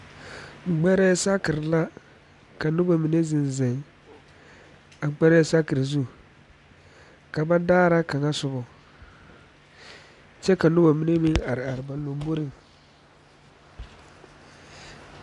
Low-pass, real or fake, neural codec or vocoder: 10.8 kHz; real; none